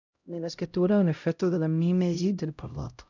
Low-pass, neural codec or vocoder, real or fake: 7.2 kHz; codec, 16 kHz, 0.5 kbps, X-Codec, HuBERT features, trained on LibriSpeech; fake